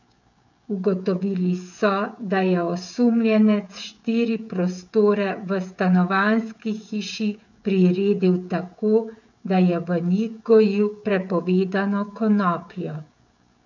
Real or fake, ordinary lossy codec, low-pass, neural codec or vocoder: fake; none; 7.2 kHz; codec, 16 kHz, 16 kbps, FreqCodec, smaller model